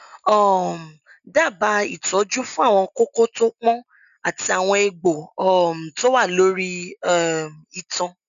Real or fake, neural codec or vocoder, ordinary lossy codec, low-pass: real; none; none; 7.2 kHz